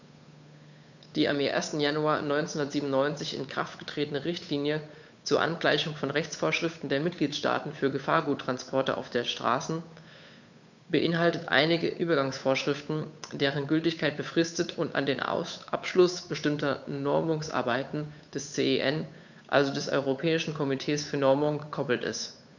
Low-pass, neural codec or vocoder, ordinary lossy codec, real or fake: 7.2 kHz; codec, 16 kHz, 8 kbps, FunCodec, trained on Chinese and English, 25 frames a second; none; fake